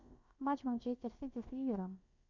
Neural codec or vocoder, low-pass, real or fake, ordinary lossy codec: codec, 16 kHz, about 1 kbps, DyCAST, with the encoder's durations; 7.2 kHz; fake; Opus, 64 kbps